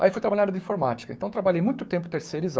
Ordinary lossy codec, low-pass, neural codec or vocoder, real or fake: none; none; codec, 16 kHz, 6 kbps, DAC; fake